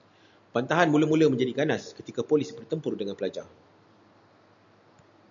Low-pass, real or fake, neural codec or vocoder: 7.2 kHz; real; none